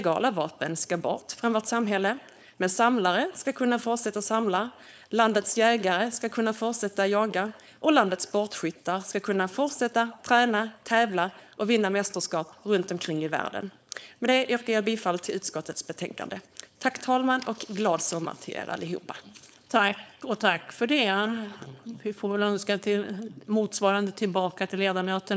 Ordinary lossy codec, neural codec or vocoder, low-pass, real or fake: none; codec, 16 kHz, 4.8 kbps, FACodec; none; fake